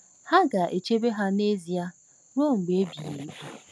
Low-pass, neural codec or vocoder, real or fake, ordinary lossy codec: none; none; real; none